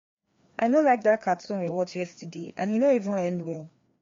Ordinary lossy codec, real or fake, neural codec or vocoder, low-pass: MP3, 48 kbps; fake; codec, 16 kHz, 2 kbps, FreqCodec, larger model; 7.2 kHz